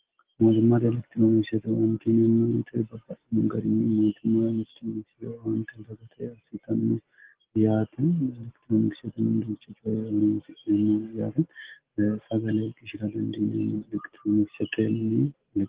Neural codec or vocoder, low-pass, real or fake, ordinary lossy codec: none; 3.6 kHz; real; Opus, 16 kbps